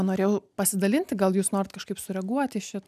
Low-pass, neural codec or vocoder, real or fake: 14.4 kHz; none; real